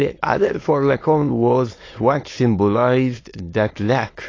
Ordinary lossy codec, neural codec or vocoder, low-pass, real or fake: AAC, 32 kbps; autoencoder, 22.05 kHz, a latent of 192 numbers a frame, VITS, trained on many speakers; 7.2 kHz; fake